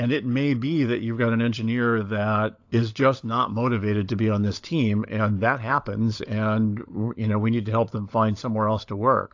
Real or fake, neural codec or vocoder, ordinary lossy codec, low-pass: fake; codec, 16 kHz, 16 kbps, FunCodec, trained on LibriTTS, 50 frames a second; AAC, 48 kbps; 7.2 kHz